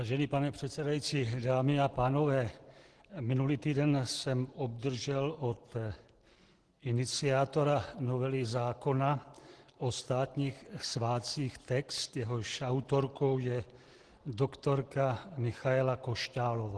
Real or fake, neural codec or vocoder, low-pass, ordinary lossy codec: real; none; 10.8 kHz; Opus, 16 kbps